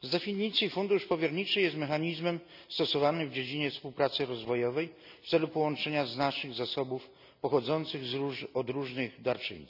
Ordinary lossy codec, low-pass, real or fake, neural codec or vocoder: none; 5.4 kHz; real; none